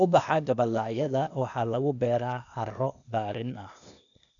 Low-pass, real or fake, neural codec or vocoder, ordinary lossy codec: 7.2 kHz; fake; codec, 16 kHz, 0.8 kbps, ZipCodec; none